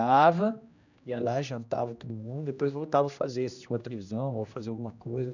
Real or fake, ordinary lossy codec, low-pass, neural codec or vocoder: fake; none; 7.2 kHz; codec, 16 kHz, 1 kbps, X-Codec, HuBERT features, trained on general audio